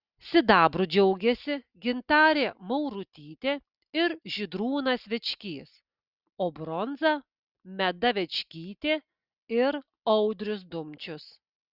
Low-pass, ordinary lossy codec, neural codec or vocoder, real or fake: 5.4 kHz; Opus, 64 kbps; none; real